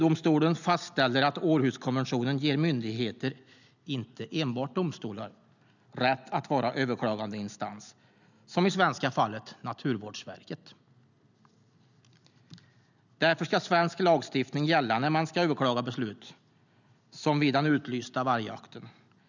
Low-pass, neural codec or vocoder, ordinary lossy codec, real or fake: 7.2 kHz; none; none; real